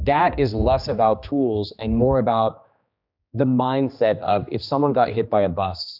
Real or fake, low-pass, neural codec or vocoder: fake; 5.4 kHz; codec, 16 kHz, 2 kbps, X-Codec, HuBERT features, trained on general audio